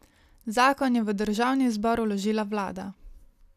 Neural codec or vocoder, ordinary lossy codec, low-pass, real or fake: none; none; 14.4 kHz; real